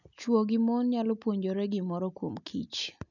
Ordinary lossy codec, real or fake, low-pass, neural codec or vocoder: none; real; 7.2 kHz; none